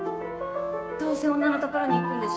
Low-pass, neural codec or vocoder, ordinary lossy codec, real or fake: none; codec, 16 kHz, 6 kbps, DAC; none; fake